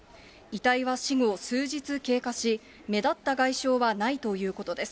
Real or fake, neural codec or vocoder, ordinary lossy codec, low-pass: real; none; none; none